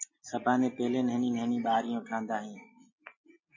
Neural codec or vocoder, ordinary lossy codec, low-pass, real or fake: none; MP3, 32 kbps; 7.2 kHz; real